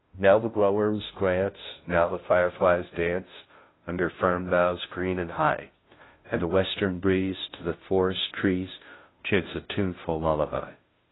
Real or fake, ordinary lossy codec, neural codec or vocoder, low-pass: fake; AAC, 16 kbps; codec, 16 kHz, 0.5 kbps, FunCodec, trained on Chinese and English, 25 frames a second; 7.2 kHz